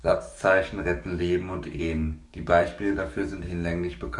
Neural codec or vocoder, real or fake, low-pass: codec, 44.1 kHz, 7.8 kbps, DAC; fake; 10.8 kHz